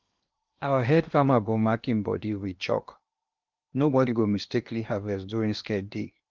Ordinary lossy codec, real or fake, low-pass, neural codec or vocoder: Opus, 24 kbps; fake; 7.2 kHz; codec, 16 kHz in and 24 kHz out, 0.8 kbps, FocalCodec, streaming, 65536 codes